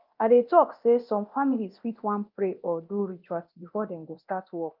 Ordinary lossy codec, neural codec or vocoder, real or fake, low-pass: Opus, 24 kbps; codec, 24 kHz, 0.9 kbps, DualCodec; fake; 5.4 kHz